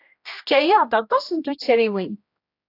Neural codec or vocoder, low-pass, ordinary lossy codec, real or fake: codec, 16 kHz, 1 kbps, X-Codec, HuBERT features, trained on general audio; 5.4 kHz; AAC, 32 kbps; fake